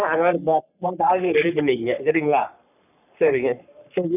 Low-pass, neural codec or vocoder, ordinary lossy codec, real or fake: 3.6 kHz; codec, 44.1 kHz, 3.4 kbps, Pupu-Codec; none; fake